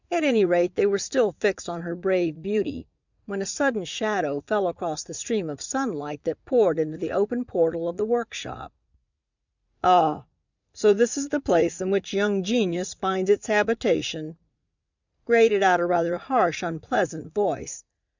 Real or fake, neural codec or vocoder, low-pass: fake; vocoder, 44.1 kHz, 80 mel bands, Vocos; 7.2 kHz